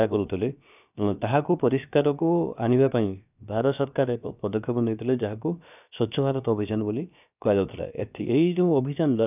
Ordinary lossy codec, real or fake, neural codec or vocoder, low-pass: none; fake; codec, 16 kHz, about 1 kbps, DyCAST, with the encoder's durations; 3.6 kHz